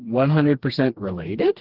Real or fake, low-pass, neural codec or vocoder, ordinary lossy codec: fake; 5.4 kHz; codec, 16 kHz, 2 kbps, FreqCodec, smaller model; Opus, 16 kbps